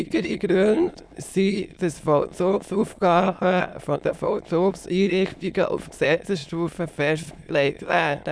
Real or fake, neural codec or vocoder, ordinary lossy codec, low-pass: fake; autoencoder, 22.05 kHz, a latent of 192 numbers a frame, VITS, trained on many speakers; none; none